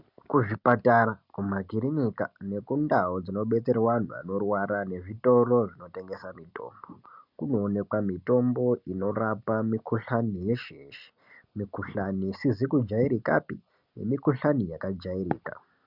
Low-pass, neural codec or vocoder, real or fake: 5.4 kHz; none; real